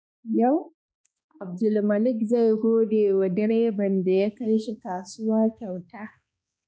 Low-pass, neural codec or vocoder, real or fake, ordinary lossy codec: none; codec, 16 kHz, 2 kbps, X-Codec, HuBERT features, trained on balanced general audio; fake; none